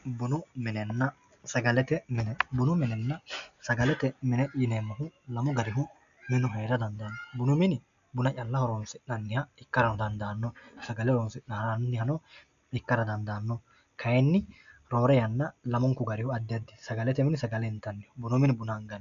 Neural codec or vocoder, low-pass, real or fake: none; 7.2 kHz; real